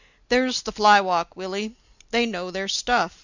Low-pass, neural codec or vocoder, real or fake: 7.2 kHz; none; real